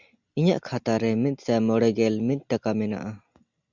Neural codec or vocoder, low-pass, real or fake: none; 7.2 kHz; real